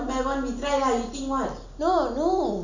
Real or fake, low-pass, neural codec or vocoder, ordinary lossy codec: real; 7.2 kHz; none; none